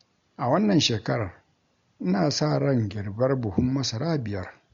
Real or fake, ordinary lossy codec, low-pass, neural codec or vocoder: real; MP3, 48 kbps; 7.2 kHz; none